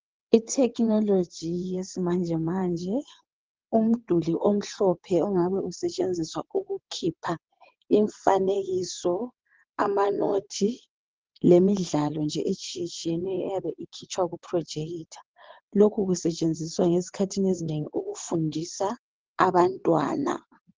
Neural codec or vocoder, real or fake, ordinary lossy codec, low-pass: vocoder, 22.05 kHz, 80 mel bands, WaveNeXt; fake; Opus, 16 kbps; 7.2 kHz